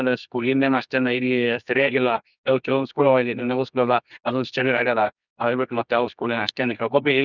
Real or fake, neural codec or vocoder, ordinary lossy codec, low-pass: fake; codec, 24 kHz, 0.9 kbps, WavTokenizer, medium music audio release; none; 7.2 kHz